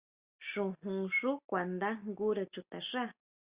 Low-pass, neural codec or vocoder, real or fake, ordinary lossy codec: 3.6 kHz; none; real; Opus, 64 kbps